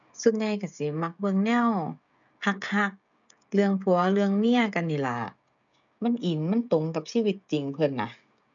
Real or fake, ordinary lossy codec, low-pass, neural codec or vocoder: fake; none; 7.2 kHz; codec, 16 kHz, 16 kbps, FreqCodec, smaller model